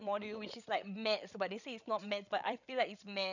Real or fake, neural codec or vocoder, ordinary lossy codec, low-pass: fake; codec, 16 kHz, 8 kbps, FreqCodec, larger model; none; 7.2 kHz